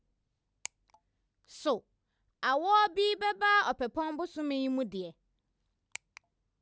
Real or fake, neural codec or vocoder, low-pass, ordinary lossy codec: real; none; none; none